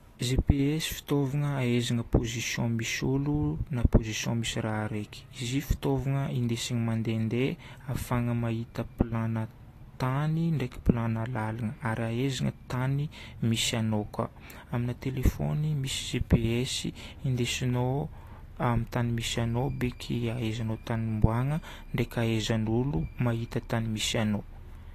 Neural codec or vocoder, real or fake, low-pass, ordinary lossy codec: none; real; 14.4 kHz; AAC, 48 kbps